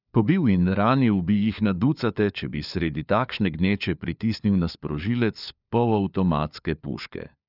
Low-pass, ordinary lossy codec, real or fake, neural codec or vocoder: 5.4 kHz; none; fake; codec, 16 kHz, 4 kbps, FunCodec, trained on LibriTTS, 50 frames a second